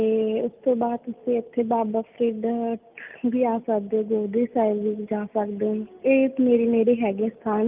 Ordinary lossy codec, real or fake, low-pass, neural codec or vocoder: Opus, 24 kbps; real; 3.6 kHz; none